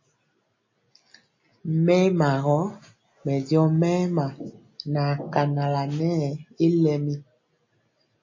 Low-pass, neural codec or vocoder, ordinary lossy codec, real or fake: 7.2 kHz; none; MP3, 32 kbps; real